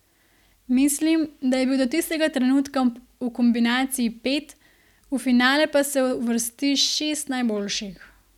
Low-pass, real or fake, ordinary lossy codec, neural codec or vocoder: 19.8 kHz; real; none; none